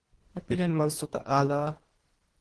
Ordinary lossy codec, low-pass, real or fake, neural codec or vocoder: Opus, 16 kbps; 10.8 kHz; fake; codec, 24 kHz, 1.5 kbps, HILCodec